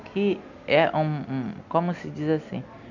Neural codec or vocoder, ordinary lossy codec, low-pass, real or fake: none; none; 7.2 kHz; real